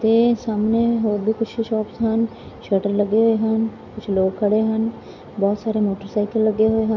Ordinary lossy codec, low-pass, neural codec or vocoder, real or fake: none; 7.2 kHz; none; real